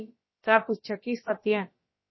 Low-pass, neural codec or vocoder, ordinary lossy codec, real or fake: 7.2 kHz; codec, 16 kHz, about 1 kbps, DyCAST, with the encoder's durations; MP3, 24 kbps; fake